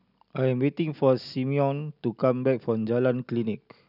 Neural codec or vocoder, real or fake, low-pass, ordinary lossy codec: none; real; 5.4 kHz; none